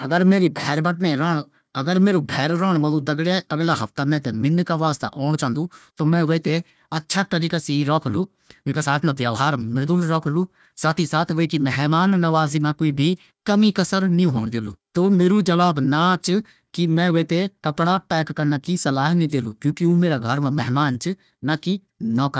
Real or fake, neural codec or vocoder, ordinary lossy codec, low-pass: fake; codec, 16 kHz, 1 kbps, FunCodec, trained on Chinese and English, 50 frames a second; none; none